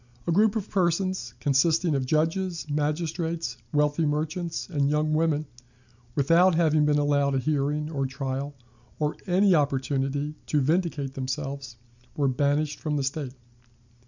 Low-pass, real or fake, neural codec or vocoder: 7.2 kHz; real; none